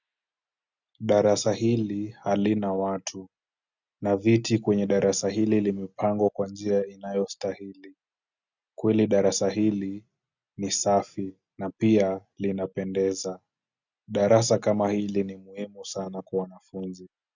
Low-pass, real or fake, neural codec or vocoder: 7.2 kHz; real; none